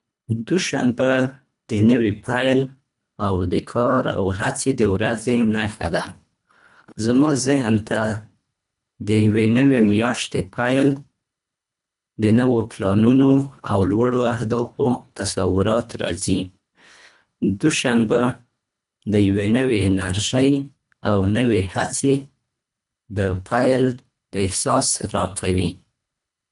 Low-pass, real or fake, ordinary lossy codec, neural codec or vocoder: 10.8 kHz; fake; none; codec, 24 kHz, 1.5 kbps, HILCodec